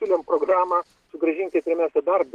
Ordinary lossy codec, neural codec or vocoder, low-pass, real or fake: Opus, 16 kbps; none; 14.4 kHz; real